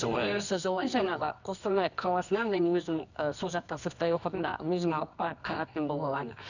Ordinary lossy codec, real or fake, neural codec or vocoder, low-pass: none; fake; codec, 24 kHz, 0.9 kbps, WavTokenizer, medium music audio release; 7.2 kHz